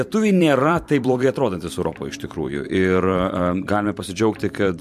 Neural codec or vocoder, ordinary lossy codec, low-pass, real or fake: vocoder, 44.1 kHz, 128 mel bands every 512 samples, BigVGAN v2; MP3, 64 kbps; 14.4 kHz; fake